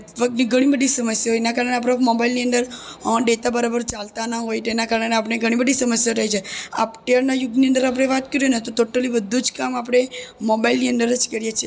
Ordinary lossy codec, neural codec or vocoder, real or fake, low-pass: none; none; real; none